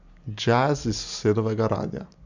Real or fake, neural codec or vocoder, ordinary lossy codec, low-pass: real; none; none; 7.2 kHz